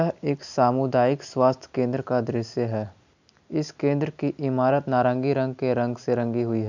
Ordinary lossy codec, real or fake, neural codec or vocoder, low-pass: none; real; none; 7.2 kHz